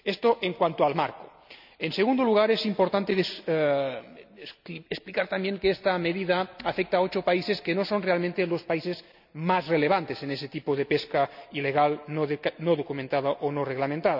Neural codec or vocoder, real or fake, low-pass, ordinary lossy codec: none; real; 5.4 kHz; none